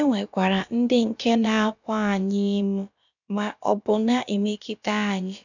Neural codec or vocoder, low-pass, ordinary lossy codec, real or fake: codec, 16 kHz, about 1 kbps, DyCAST, with the encoder's durations; 7.2 kHz; none; fake